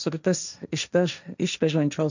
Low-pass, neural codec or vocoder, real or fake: 7.2 kHz; codec, 16 kHz, 1.1 kbps, Voila-Tokenizer; fake